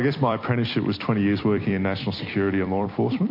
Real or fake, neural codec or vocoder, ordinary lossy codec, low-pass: fake; vocoder, 44.1 kHz, 128 mel bands every 256 samples, BigVGAN v2; MP3, 32 kbps; 5.4 kHz